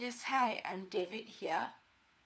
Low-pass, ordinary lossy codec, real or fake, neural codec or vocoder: none; none; fake; codec, 16 kHz, 4 kbps, FunCodec, trained on LibriTTS, 50 frames a second